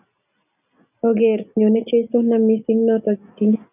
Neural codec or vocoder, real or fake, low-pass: none; real; 3.6 kHz